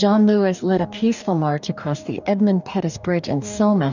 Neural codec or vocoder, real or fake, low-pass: codec, 44.1 kHz, 2.6 kbps, DAC; fake; 7.2 kHz